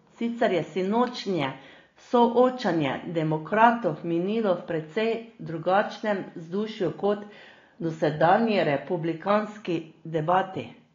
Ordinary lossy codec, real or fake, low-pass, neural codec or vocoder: AAC, 32 kbps; real; 7.2 kHz; none